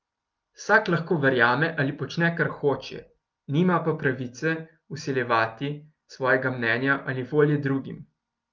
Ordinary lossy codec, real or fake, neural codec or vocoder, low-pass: Opus, 32 kbps; real; none; 7.2 kHz